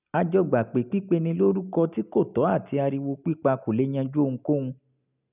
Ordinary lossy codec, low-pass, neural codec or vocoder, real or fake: none; 3.6 kHz; none; real